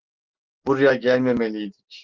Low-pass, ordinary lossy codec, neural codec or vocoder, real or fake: 7.2 kHz; Opus, 16 kbps; none; real